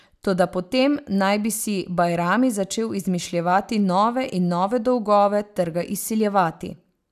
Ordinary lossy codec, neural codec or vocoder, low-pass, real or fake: none; none; 14.4 kHz; real